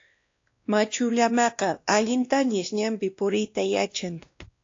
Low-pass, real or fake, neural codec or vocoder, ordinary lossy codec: 7.2 kHz; fake; codec, 16 kHz, 1 kbps, X-Codec, WavLM features, trained on Multilingual LibriSpeech; AAC, 48 kbps